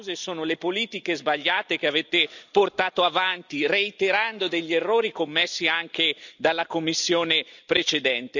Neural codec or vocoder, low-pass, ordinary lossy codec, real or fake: none; 7.2 kHz; none; real